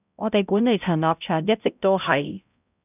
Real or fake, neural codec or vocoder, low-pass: fake; codec, 16 kHz, 0.5 kbps, X-Codec, HuBERT features, trained on balanced general audio; 3.6 kHz